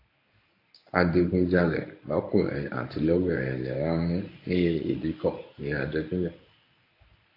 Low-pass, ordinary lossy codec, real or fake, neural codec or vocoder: 5.4 kHz; AAC, 32 kbps; fake; codec, 24 kHz, 0.9 kbps, WavTokenizer, medium speech release version 1